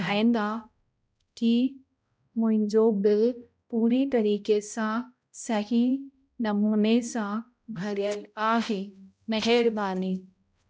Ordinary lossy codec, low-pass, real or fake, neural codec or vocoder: none; none; fake; codec, 16 kHz, 0.5 kbps, X-Codec, HuBERT features, trained on balanced general audio